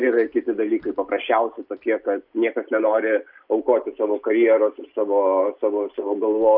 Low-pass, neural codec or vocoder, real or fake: 5.4 kHz; none; real